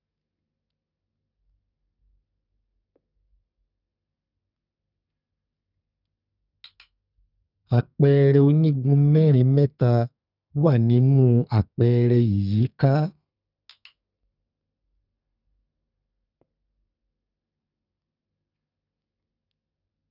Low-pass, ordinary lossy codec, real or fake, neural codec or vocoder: 5.4 kHz; none; fake; codec, 32 kHz, 1.9 kbps, SNAC